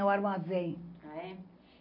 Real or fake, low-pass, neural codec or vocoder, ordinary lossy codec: real; 5.4 kHz; none; AAC, 48 kbps